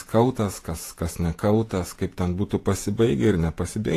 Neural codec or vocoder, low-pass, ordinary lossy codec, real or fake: vocoder, 48 kHz, 128 mel bands, Vocos; 14.4 kHz; AAC, 48 kbps; fake